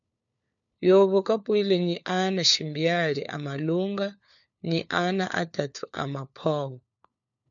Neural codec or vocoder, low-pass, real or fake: codec, 16 kHz, 4 kbps, FunCodec, trained on LibriTTS, 50 frames a second; 7.2 kHz; fake